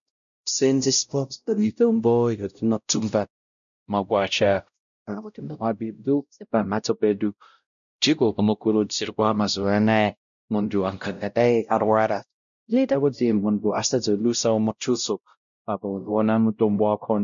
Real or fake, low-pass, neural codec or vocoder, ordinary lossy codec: fake; 7.2 kHz; codec, 16 kHz, 0.5 kbps, X-Codec, WavLM features, trained on Multilingual LibriSpeech; MP3, 96 kbps